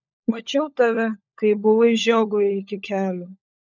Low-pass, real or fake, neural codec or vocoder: 7.2 kHz; fake; codec, 16 kHz, 16 kbps, FunCodec, trained on LibriTTS, 50 frames a second